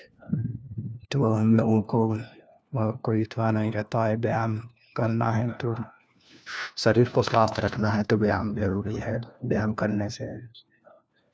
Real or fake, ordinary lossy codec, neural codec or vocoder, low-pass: fake; none; codec, 16 kHz, 1 kbps, FunCodec, trained on LibriTTS, 50 frames a second; none